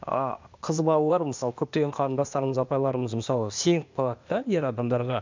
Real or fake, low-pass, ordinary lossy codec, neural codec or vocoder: fake; 7.2 kHz; MP3, 64 kbps; codec, 16 kHz, 0.8 kbps, ZipCodec